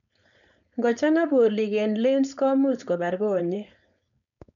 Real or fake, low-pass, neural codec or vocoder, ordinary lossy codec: fake; 7.2 kHz; codec, 16 kHz, 4.8 kbps, FACodec; none